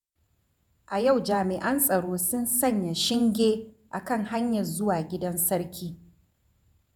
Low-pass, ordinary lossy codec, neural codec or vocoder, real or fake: none; none; vocoder, 48 kHz, 128 mel bands, Vocos; fake